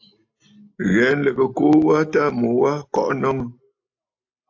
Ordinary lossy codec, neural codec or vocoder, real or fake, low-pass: AAC, 48 kbps; none; real; 7.2 kHz